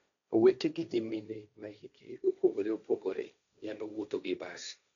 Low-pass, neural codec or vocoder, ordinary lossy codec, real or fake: 7.2 kHz; codec, 16 kHz, 1.1 kbps, Voila-Tokenizer; none; fake